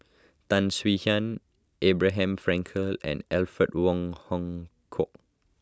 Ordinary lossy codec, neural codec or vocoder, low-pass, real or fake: none; none; none; real